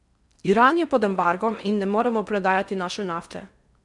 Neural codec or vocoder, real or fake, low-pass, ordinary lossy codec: codec, 16 kHz in and 24 kHz out, 0.6 kbps, FocalCodec, streaming, 4096 codes; fake; 10.8 kHz; none